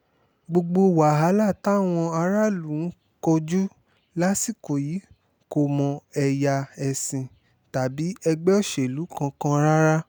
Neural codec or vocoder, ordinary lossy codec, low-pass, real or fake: none; none; none; real